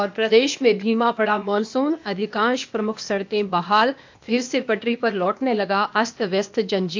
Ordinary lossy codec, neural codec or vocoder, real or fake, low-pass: MP3, 64 kbps; codec, 16 kHz, 0.8 kbps, ZipCodec; fake; 7.2 kHz